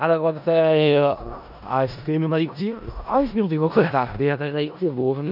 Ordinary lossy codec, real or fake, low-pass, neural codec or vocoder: none; fake; 5.4 kHz; codec, 16 kHz in and 24 kHz out, 0.4 kbps, LongCat-Audio-Codec, four codebook decoder